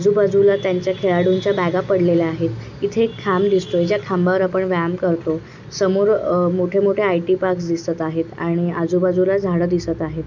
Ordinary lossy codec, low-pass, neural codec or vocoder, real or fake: none; 7.2 kHz; none; real